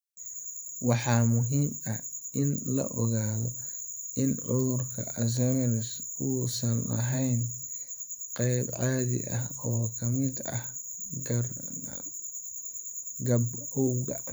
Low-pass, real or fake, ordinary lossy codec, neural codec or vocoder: none; fake; none; vocoder, 44.1 kHz, 128 mel bands every 512 samples, BigVGAN v2